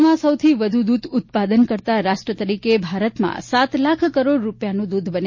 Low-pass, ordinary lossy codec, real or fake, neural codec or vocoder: 7.2 kHz; MP3, 32 kbps; real; none